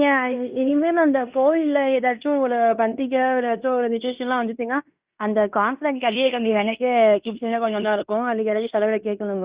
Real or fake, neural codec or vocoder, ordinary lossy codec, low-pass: fake; codec, 16 kHz in and 24 kHz out, 0.9 kbps, LongCat-Audio-Codec, fine tuned four codebook decoder; Opus, 64 kbps; 3.6 kHz